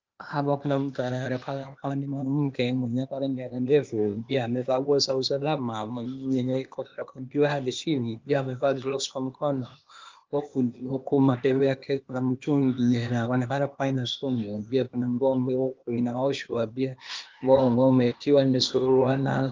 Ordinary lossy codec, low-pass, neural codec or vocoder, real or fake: Opus, 32 kbps; 7.2 kHz; codec, 16 kHz, 0.8 kbps, ZipCodec; fake